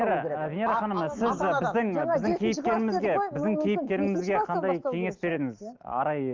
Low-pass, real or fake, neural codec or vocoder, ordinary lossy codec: 7.2 kHz; real; none; Opus, 32 kbps